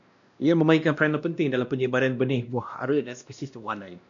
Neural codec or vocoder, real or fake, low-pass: codec, 16 kHz, 1 kbps, X-Codec, WavLM features, trained on Multilingual LibriSpeech; fake; 7.2 kHz